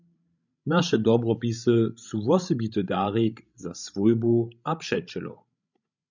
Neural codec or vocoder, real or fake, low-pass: codec, 16 kHz, 16 kbps, FreqCodec, larger model; fake; 7.2 kHz